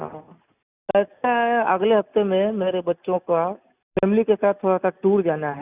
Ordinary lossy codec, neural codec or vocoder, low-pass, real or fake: Opus, 64 kbps; none; 3.6 kHz; real